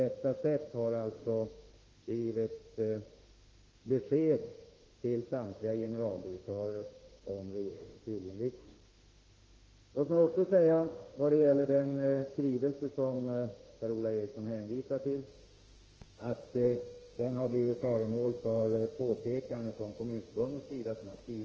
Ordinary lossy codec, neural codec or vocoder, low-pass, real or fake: Opus, 16 kbps; autoencoder, 48 kHz, 32 numbers a frame, DAC-VAE, trained on Japanese speech; 7.2 kHz; fake